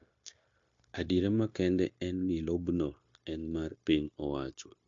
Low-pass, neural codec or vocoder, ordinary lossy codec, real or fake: 7.2 kHz; codec, 16 kHz, 0.9 kbps, LongCat-Audio-Codec; MP3, 48 kbps; fake